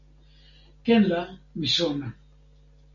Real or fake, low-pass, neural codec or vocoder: real; 7.2 kHz; none